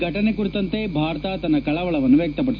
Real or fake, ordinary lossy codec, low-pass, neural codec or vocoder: real; none; 7.2 kHz; none